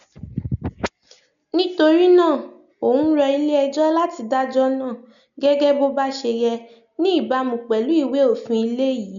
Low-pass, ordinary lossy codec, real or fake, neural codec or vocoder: 7.2 kHz; none; real; none